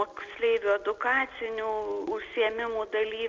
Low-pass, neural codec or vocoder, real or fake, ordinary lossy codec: 7.2 kHz; none; real; Opus, 24 kbps